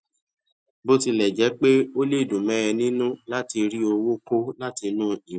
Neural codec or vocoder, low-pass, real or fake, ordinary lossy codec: none; none; real; none